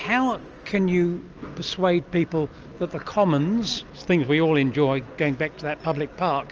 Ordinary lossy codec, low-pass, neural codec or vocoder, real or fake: Opus, 24 kbps; 7.2 kHz; none; real